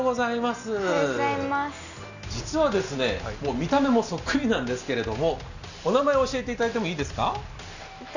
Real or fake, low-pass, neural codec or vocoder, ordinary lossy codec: real; 7.2 kHz; none; none